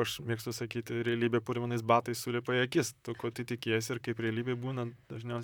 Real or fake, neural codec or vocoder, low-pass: fake; vocoder, 44.1 kHz, 128 mel bands, Pupu-Vocoder; 19.8 kHz